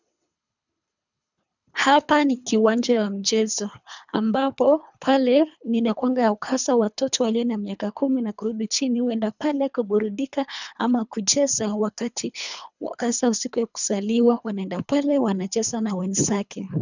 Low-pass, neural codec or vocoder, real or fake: 7.2 kHz; codec, 24 kHz, 3 kbps, HILCodec; fake